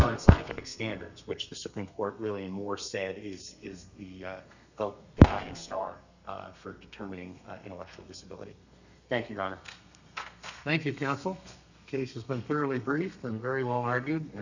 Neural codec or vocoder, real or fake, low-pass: codec, 32 kHz, 1.9 kbps, SNAC; fake; 7.2 kHz